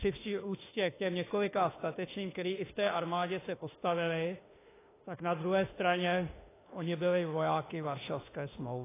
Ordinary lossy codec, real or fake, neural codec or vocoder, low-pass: AAC, 16 kbps; fake; autoencoder, 48 kHz, 32 numbers a frame, DAC-VAE, trained on Japanese speech; 3.6 kHz